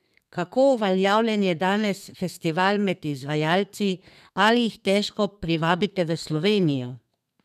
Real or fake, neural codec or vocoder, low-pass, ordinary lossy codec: fake; codec, 32 kHz, 1.9 kbps, SNAC; 14.4 kHz; none